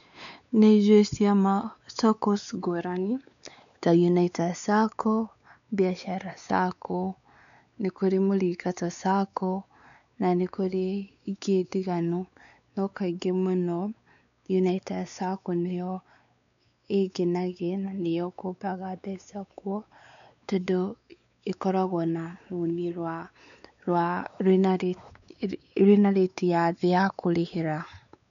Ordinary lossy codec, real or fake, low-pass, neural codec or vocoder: none; fake; 7.2 kHz; codec, 16 kHz, 4 kbps, X-Codec, WavLM features, trained on Multilingual LibriSpeech